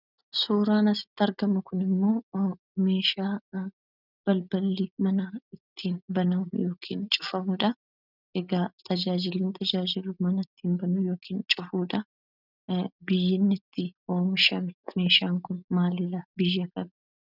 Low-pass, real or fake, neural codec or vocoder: 5.4 kHz; real; none